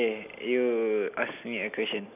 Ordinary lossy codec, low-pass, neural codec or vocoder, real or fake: none; 3.6 kHz; none; real